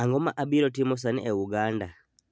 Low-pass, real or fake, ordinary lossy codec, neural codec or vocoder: none; real; none; none